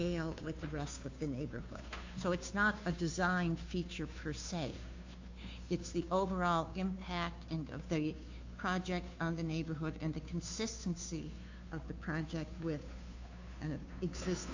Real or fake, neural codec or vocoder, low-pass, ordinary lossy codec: fake; codec, 16 kHz, 2 kbps, FunCodec, trained on Chinese and English, 25 frames a second; 7.2 kHz; AAC, 48 kbps